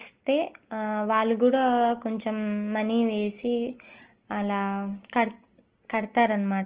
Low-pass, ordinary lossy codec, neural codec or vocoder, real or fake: 3.6 kHz; Opus, 16 kbps; none; real